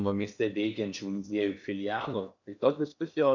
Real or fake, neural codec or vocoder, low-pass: fake; codec, 16 kHz in and 24 kHz out, 0.8 kbps, FocalCodec, streaming, 65536 codes; 7.2 kHz